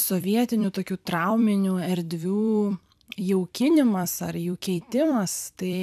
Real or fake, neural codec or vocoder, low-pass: fake; vocoder, 44.1 kHz, 128 mel bands every 256 samples, BigVGAN v2; 14.4 kHz